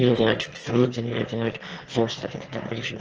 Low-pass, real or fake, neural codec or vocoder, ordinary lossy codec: 7.2 kHz; fake; autoencoder, 22.05 kHz, a latent of 192 numbers a frame, VITS, trained on one speaker; Opus, 16 kbps